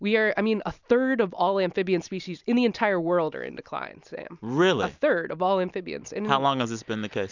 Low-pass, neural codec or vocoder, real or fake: 7.2 kHz; none; real